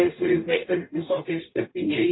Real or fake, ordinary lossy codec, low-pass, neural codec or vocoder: fake; AAC, 16 kbps; 7.2 kHz; codec, 44.1 kHz, 0.9 kbps, DAC